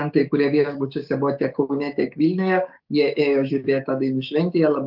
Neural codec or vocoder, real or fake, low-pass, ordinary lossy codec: codec, 16 kHz, 6 kbps, DAC; fake; 5.4 kHz; Opus, 32 kbps